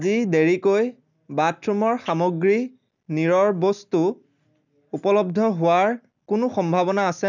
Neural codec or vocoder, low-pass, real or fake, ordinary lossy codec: none; 7.2 kHz; real; none